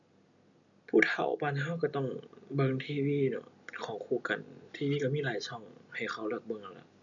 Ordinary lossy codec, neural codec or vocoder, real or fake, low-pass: none; none; real; 7.2 kHz